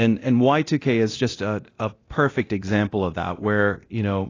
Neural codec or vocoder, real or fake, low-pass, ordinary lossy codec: codec, 24 kHz, 0.9 kbps, DualCodec; fake; 7.2 kHz; AAC, 32 kbps